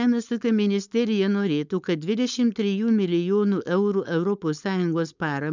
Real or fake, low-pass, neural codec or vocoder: fake; 7.2 kHz; codec, 16 kHz, 4.8 kbps, FACodec